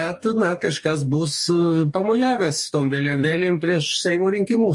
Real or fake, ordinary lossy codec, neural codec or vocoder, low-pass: fake; MP3, 48 kbps; codec, 44.1 kHz, 2.6 kbps, DAC; 10.8 kHz